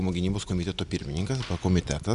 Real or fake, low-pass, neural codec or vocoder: real; 10.8 kHz; none